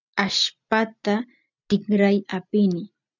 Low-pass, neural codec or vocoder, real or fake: 7.2 kHz; none; real